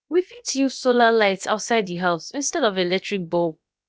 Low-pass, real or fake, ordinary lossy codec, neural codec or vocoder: none; fake; none; codec, 16 kHz, about 1 kbps, DyCAST, with the encoder's durations